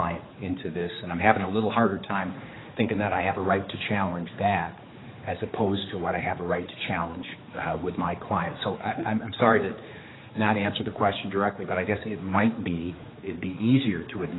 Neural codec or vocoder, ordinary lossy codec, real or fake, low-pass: codec, 16 kHz, 4 kbps, X-Codec, HuBERT features, trained on general audio; AAC, 16 kbps; fake; 7.2 kHz